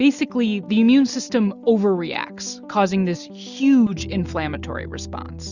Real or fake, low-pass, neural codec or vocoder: real; 7.2 kHz; none